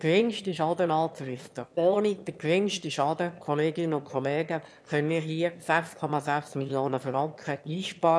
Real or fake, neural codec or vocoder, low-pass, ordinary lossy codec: fake; autoencoder, 22.05 kHz, a latent of 192 numbers a frame, VITS, trained on one speaker; none; none